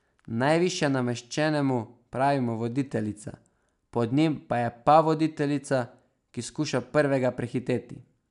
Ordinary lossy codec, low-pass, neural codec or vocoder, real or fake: none; 10.8 kHz; none; real